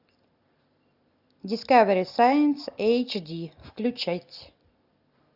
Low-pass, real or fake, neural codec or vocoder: 5.4 kHz; real; none